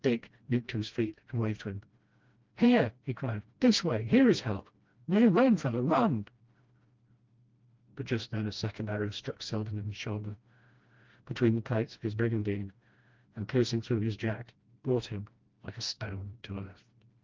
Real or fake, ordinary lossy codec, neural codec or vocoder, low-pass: fake; Opus, 32 kbps; codec, 16 kHz, 1 kbps, FreqCodec, smaller model; 7.2 kHz